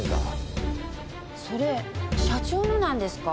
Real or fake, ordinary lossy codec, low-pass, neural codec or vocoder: real; none; none; none